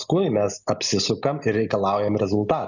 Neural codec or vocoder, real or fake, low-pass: none; real; 7.2 kHz